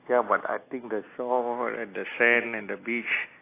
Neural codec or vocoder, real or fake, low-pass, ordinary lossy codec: none; real; 3.6 kHz; AAC, 24 kbps